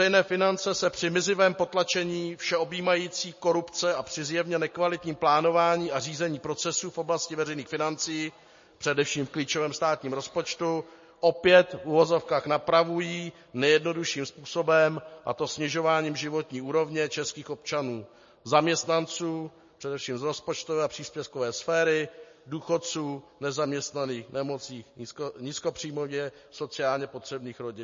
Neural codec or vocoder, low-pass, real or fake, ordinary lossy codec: none; 7.2 kHz; real; MP3, 32 kbps